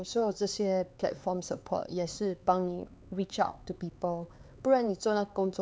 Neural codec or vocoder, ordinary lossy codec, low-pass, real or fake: codec, 16 kHz, 4 kbps, X-Codec, HuBERT features, trained on LibriSpeech; none; none; fake